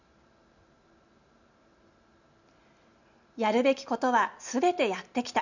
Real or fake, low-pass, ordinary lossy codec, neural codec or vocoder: real; 7.2 kHz; none; none